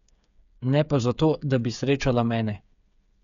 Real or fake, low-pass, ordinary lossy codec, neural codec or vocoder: fake; 7.2 kHz; none; codec, 16 kHz, 8 kbps, FreqCodec, smaller model